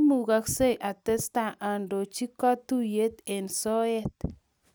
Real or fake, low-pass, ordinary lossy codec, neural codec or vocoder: real; none; none; none